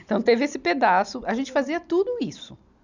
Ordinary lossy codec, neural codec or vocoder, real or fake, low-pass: none; none; real; 7.2 kHz